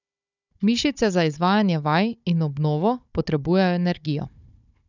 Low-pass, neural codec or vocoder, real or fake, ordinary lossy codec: 7.2 kHz; codec, 16 kHz, 16 kbps, FunCodec, trained on Chinese and English, 50 frames a second; fake; none